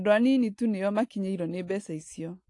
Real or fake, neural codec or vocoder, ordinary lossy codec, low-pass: real; none; AAC, 48 kbps; 10.8 kHz